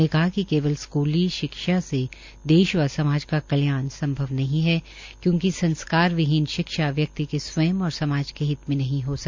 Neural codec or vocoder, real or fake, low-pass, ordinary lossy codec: none; real; 7.2 kHz; MP3, 64 kbps